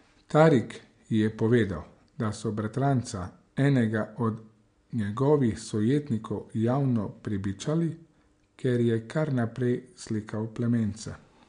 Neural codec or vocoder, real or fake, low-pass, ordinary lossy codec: none; real; 9.9 kHz; MP3, 64 kbps